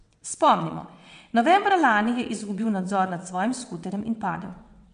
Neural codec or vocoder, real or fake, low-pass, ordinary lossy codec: vocoder, 22.05 kHz, 80 mel bands, WaveNeXt; fake; 9.9 kHz; MP3, 64 kbps